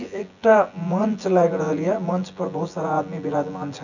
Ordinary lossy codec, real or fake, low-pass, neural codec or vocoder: none; fake; 7.2 kHz; vocoder, 24 kHz, 100 mel bands, Vocos